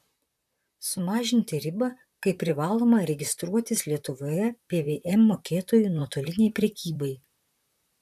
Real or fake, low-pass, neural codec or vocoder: fake; 14.4 kHz; vocoder, 44.1 kHz, 128 mel bands, Pupu-Vocoder